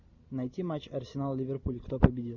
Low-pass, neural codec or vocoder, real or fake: 7.2 kHz; none; real